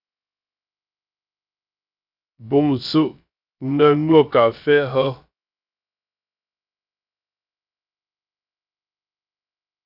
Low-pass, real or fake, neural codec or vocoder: 5.4 kHz; fake; codec, 16 kHz, 0.3 kbps, FocalCodec